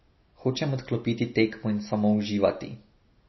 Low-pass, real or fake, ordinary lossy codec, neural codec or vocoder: 7.2 kHz; real; MP3, 24 kbps; none